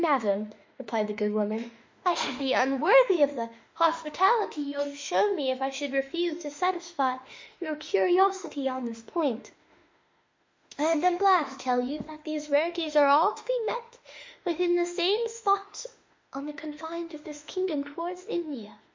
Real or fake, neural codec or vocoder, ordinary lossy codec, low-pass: fake; autoencoder, 48 kHz, 32 numbers a frame, DAC-VAE, trained on Japanese speech; MP3, 48 kbps; 7.2 kHz